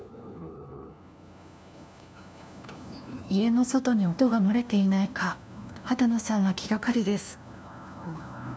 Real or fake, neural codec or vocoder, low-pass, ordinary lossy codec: fake; codec, 16 kHz, 1 kbps, FunCodec, trained on LibriTTS, 50 frames a second; none; none